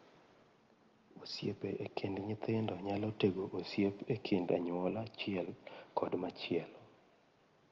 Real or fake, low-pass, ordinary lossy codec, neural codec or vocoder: real; 7.2 kHz; Opus, 32 kbps; none